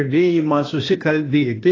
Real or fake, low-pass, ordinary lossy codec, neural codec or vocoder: fake; 7.2 kHz; AAC, 32 kbps; codec, 16 kHz, 0.8 kbps, ZipCodec